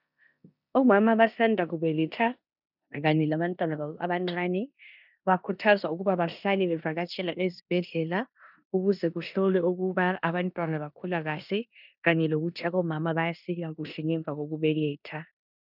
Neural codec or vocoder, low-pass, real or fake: codec, 16 kHz in and 24 kHz out, 0.9 kbps, LongCat-Audio-Codec, four codebook decoder; 5.4 kHz; fake